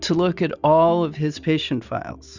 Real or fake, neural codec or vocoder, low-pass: real; none; 7.2 kHz